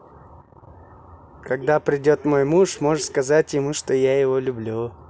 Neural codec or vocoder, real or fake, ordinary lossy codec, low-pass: none; real; none; none